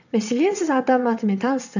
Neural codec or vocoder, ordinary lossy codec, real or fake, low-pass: vocoder, 22.05 kHz, 80 mel bands, WaveNeXt; none; fake; 7.2 kHz